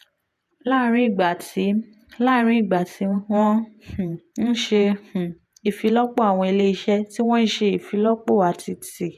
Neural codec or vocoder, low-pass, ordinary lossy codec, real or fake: vocoder, 48 kHz, 128 mel bands, Vocos; 14.4 kHz; none; fake